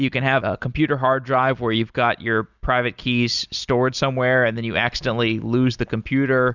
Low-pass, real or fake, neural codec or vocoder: 7.2 kHz; real; none